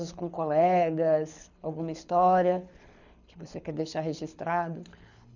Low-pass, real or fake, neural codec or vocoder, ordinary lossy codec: 7.2 kHz; fake; codec, 24 kHz, 6 kbps, HILCodec; none